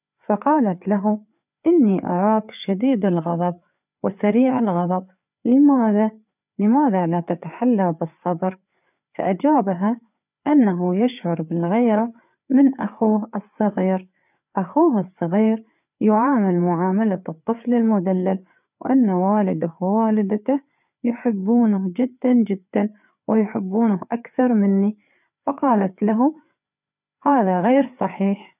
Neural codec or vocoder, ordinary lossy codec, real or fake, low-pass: codec, 16 kHz, 4 kbps, FreqCodec, larger model; none; fake; 3.6 kHz